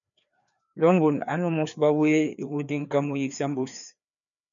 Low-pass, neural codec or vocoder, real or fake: 7.2 kHz; codec, 16 kHz, 2 kbps, FreqCodec, larger model; fake